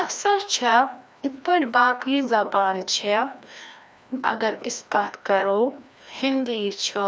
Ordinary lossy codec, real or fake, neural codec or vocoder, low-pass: none; fake; codec, 16 kHz, 1 kbps, FreqCodec, larger model; none